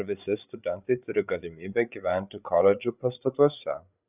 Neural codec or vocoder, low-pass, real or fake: codec, 16 kHz, 8 kbps, FreqCodec, larger model; 3.6 kHz; fake